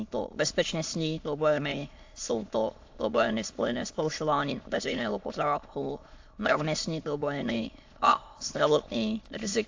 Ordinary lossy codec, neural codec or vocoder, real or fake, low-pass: AAC, 48 kbps; autoencoder, 22.05 kHz, a latent of 192 numbers a frame, VITS, trained on many speakers; fake; 7.2 kHz